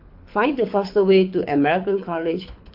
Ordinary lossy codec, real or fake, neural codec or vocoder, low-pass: MP3, 48 kbps; fake; codec, 24 kHz, 6 kbps, HILCodec; 5.4 kHz